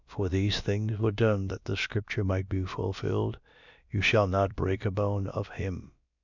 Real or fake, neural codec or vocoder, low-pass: fake; codec, 16 kHz, about 1 kbps, DyCAST, with the encoder's durations; 7.2 kHz